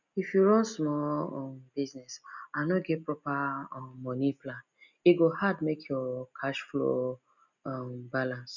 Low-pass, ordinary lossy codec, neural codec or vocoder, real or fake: 7.2 kHz; none; none; real